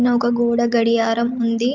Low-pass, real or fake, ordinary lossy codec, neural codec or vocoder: 7.2 kHz; real; Opus, 24 kbps; none